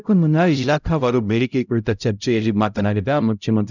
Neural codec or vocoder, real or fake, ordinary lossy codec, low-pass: codec, 16 kHz, 0.5 kbps, X-Codec, HuBERT features, trained on LibriSpeech; fake; none; 7.2 kHz